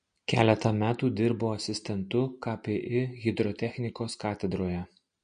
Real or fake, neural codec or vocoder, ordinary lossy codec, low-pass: real; none; MP3, 48 kbps; 9.9 kHz